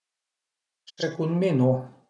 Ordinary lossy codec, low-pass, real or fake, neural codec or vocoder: none; 10.8 kHz; real; none